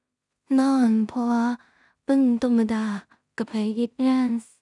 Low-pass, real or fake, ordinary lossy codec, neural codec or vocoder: 10.8 kHz; fake; none; codec, 16 kHz in and 24 kHz out, 0.4 kbps, LongCat-Audio-Codec, two codebook decoder